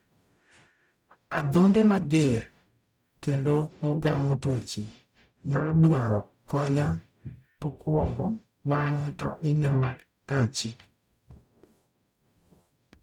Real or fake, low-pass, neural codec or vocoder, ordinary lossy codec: fake; 19.8 kHz; codec, 44.1 kHz, 0.9 kbps, DAC; none